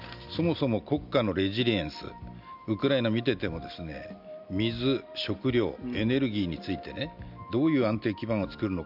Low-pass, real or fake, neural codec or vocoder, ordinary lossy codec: 5.4 kHz; real; none; none